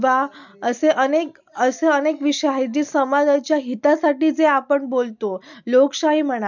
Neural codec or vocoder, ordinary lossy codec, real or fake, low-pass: none; none; real; 7.2 kHz